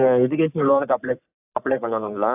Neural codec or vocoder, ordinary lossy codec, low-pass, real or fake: codec, 44.1 kHz, 3.4 kbps, Pupu-Codec; AAC, 32 kbps; 3.6 kHz; fake